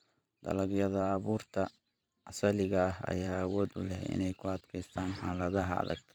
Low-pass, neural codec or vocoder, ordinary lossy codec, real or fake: none; vocoder, 44.1 kHz, 128 mel bands every 512 samples, BigVGAN v2; none; fake